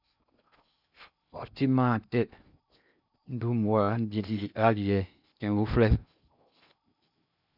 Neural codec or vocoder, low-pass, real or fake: codec, 16 kHz in and 24 kHz out, 0.6 kbps, FocalCodec, streaming, 2048 codes; 5.4 kHz; fake